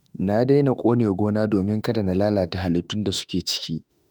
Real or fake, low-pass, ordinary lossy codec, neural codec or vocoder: fake; none; none; autoencoder, 48 kHz, 32 numbers a frame, DAC-VAE, trained on Japanese speech